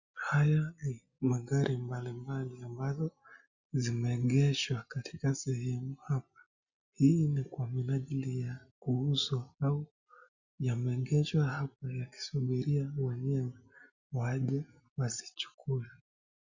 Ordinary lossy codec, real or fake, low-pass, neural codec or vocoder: Opus, 64 kbps; real; 7.2 kHz; none